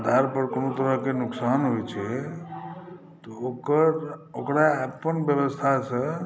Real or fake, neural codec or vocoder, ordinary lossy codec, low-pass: real; none; none; none